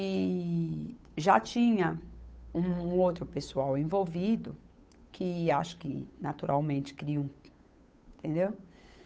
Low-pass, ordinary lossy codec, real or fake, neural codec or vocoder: none; none; fake; codec, 16 kHz, 8 kbps, FunCodec, trained on Chinese and English, 25 frames a second